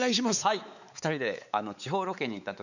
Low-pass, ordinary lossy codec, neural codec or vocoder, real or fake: 7.2 kHz; none; codec, 16 kHz, 4 kbps, X-Codec, WavLM features, trained on Multilingual LibriSpeech; fake